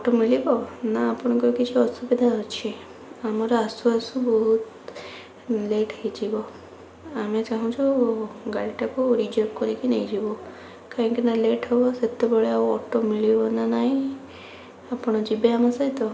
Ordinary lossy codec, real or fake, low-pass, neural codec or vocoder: none; real; none; none